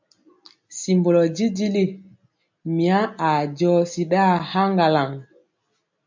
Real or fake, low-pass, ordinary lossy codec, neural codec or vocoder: real; 7.2 kHz; MP3, 64 kbps; none